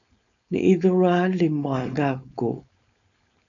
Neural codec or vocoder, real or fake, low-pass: codec, 16 kHz, 4.8 kbps, FACodec; fake; 7.2 kHz